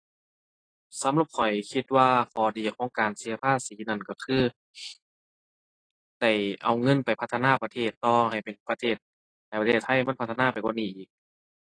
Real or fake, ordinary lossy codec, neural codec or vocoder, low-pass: real; AAC, 32 kbps; none; 9.9 kHz